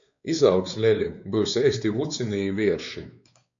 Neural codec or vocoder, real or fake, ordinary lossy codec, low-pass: codec, 16 kHz, 4 kbps, X-Codec, WavLM features, trained on Multilingual LibriSpeech; fake; MP3, 64 kbps; 7.2 kHz